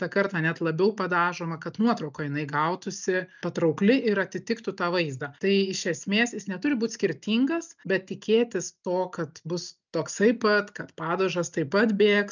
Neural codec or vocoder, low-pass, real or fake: none; 7.2 kHz; real